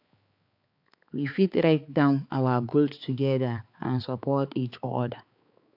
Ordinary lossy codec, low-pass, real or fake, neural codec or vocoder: AAC, 48 kbps; 5.4 kHz; fake; codec, 16 kHz, 4 kbps, X-Codec, HuBERT features, trained on balanced general audio